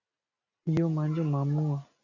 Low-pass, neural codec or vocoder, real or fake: 7.2 kHz; none; real